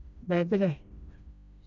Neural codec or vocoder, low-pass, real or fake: codec, 16 kHz, 1 kbps, FreqCodec, smaller model; 7.2 kHz; fake